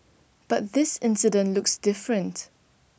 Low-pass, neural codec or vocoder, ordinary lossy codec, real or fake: none; none; none; real